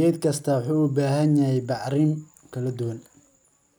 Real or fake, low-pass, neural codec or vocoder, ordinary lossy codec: real; none; none; none